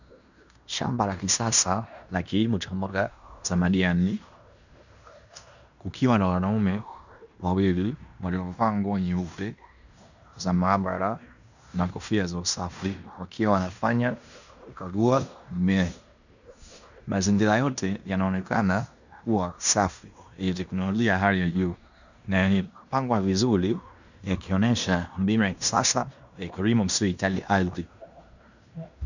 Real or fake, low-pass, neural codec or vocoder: fake; 7.2 kHz; codec, 16 kHz in and 24 kHz out, 0.9 kbps, LongCat-Audio-Codec, fine tuned four codebook decoder